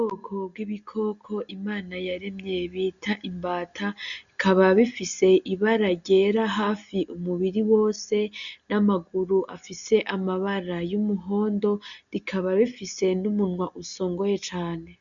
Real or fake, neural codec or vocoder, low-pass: real; none; 7.2 kHz